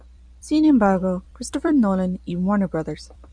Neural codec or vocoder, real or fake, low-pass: vocoder, 44.1 kHz, 128 mel bands every 256 samples, BigVGAN v2; fake; 9.9 kHz